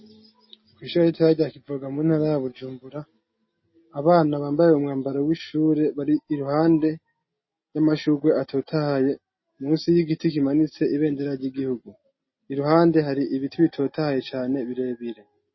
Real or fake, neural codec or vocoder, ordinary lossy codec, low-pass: real; none; MP3, 24 kbps; 7.2 kHz